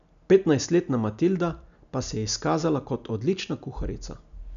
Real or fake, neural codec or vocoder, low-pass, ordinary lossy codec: real; none; 7.2 kHz; none